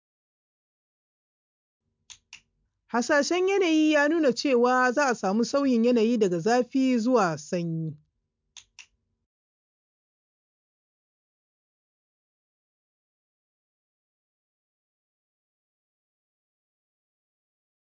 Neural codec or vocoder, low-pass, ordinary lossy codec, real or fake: none; 7.2 kHz; MP3, 64 kbps; real